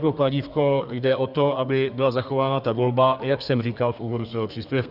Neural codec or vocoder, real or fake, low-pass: codec, 32 kHz, 1.9 kbps, SNAC; fake; 5.4 kHz